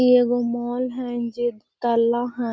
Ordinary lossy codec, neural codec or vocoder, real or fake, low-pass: none; none; real; none